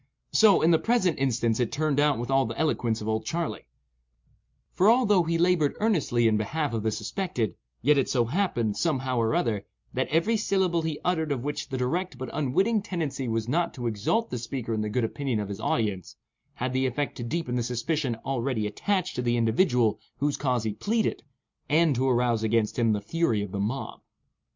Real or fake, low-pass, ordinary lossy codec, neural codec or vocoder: real; 7.2 kHz; MP3, 64 kbps; none